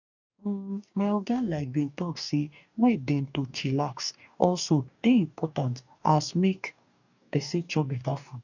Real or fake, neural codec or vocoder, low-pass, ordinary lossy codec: fake; codec, 44.1 kHz, 2.6 kbps, DAC; 7.2 kHz; none